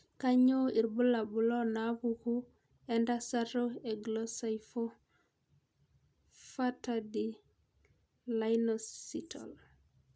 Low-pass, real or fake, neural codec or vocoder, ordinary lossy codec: none; real; none; none